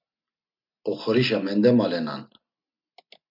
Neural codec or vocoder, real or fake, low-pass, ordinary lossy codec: none; real; 5.4 kHz; MP3, 48 kbps